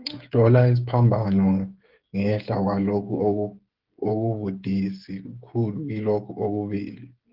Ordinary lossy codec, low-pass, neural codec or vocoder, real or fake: Opus, 16 kbps; 5.4 kHz; codec, 16 kHz, 16 kbps, FreqCodec, smaller model; fake